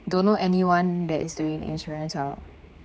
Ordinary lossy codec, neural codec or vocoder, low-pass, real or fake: none; codec, 16 kHz, 4 kbps, X-Codec, HuBERT features, trained on general audio; none; fake